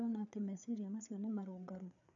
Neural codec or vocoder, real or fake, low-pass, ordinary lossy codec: codec, 16 kHz, 4 kbps, FreqCodec, larger model; fake; 7.2 kHz; none